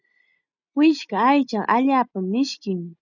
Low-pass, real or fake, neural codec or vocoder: 7.2 kHz; real; none